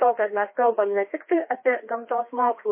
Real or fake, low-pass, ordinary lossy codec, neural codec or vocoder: fake; 3.6 kHz; MP3, 24 kbps; codec, 16 kHz, 2 kbps, FreqCodec, larger model